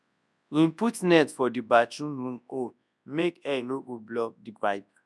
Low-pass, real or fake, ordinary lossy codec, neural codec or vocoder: none; fake; none; codec, 24 kHz, 0.9 kbps, WavTokenizer, large speech release